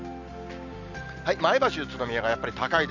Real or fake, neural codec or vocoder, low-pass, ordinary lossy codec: real; none; 7.2 kHz; none